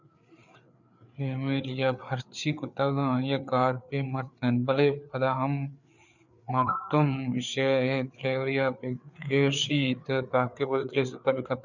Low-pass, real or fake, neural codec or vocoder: 7.2 kHz; fake; codec, 16 kHz, 4 kbps, FreqCodec, larger model